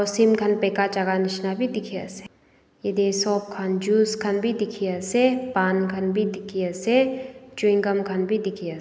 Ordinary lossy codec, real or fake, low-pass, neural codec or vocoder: none; real; none; none